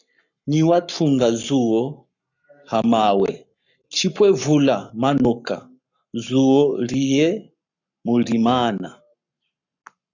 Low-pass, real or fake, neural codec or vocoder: 7.2 kHz; fake; codec, 44.1 kHz, 7.8 kbps, Pupu-Codec